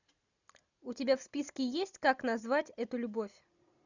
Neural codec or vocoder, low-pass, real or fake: none; 7.2 kHz; real